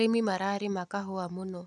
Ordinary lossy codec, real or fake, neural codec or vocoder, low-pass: none; real; none; none